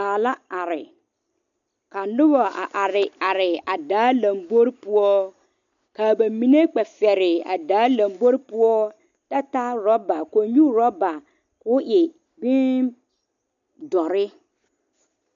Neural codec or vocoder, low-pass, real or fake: none; 7.2 kHz; real